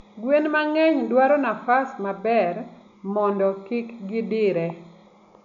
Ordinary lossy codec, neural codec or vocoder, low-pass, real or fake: none; none; 7.2 kHz; real